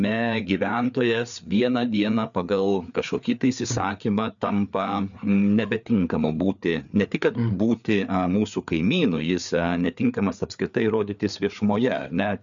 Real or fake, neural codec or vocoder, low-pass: fake; codec, 16 kHz, 4 kbps, FreqCodec, larger model; 7.2 kHz